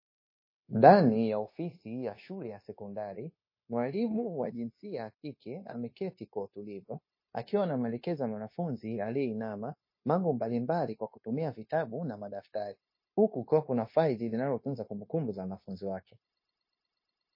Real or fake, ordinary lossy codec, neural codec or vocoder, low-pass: fake; MP3, 24 kbps; codec, 16 kHz, 0.9 kbps, LongCat-Audio-Codec; 5.4 kHz